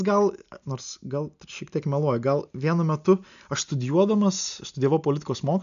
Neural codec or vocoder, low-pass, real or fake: none; 7.2 kHz; real